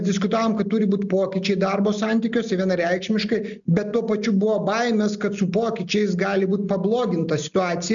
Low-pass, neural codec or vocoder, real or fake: 7.2 kHz; none; real